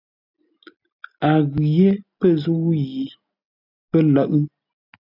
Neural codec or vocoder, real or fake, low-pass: none; real; 5.4 kHz